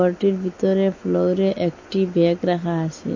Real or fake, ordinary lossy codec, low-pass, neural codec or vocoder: real; MP3, 32 kbps; 7.2 kHz; none